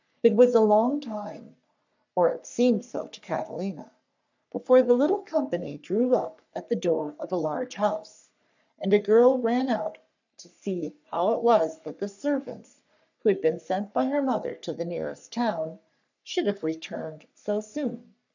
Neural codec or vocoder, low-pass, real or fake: codec, 44.1 kHz, 3.4 kbps, Pupu-Codec; 7.2 kHz; fake